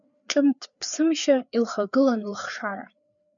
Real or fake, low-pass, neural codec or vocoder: fake; 7.2 kHz; codec, 16 kHz, 4 kbps, FreqCodec, larger model